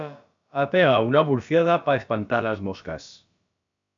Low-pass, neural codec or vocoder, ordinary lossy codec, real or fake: 7.2 kHz; codec, 16 kHz, about 1 kbps, DyCAST, with the encoder's durations; AAC, 64 kbps; fake